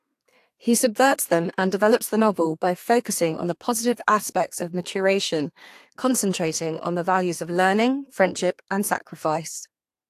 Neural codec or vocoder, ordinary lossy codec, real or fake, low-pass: codec, 32 kHz, 1.9 kbps, SNAC; AAC, 64 kbps; fake; 14.4 kHz